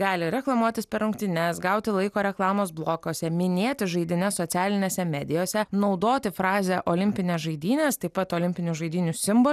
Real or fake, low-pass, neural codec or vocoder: real; 14.4 kHz; none